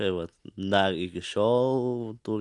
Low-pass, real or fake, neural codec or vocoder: 10.8 kHz; real; none